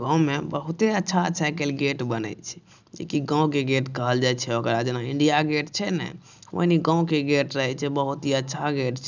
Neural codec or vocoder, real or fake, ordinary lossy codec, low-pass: none; real; none; 7.2 kHz